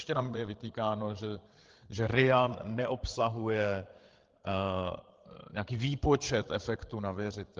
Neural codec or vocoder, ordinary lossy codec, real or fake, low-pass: codec, 16 kHz, 8 kbps, FreqCodec, larger model; Opus, 16 kbps; fake; 7.2 kHz